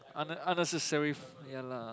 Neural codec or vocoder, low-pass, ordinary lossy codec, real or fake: none; none; none; real